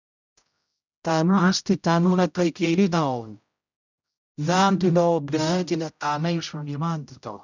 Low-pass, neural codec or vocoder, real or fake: 7.2 kHz; codec, 16 kHz, 0.5 kbps, X-Codec, HuBERT features, trained on general audio; fake